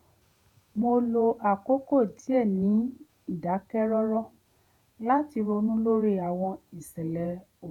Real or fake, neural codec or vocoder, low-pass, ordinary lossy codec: fake; vocoder, 48 kHz, 128 mel bands, Vocos; 19.8 kHz; none